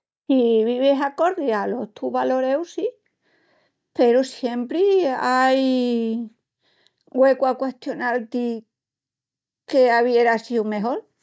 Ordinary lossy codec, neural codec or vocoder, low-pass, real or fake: none; none; none; real